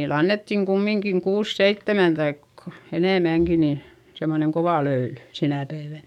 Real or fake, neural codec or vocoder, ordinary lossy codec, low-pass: fake; codec, 44.1 kHz, 7.8 kbps, DAC; none; 19.8 kHz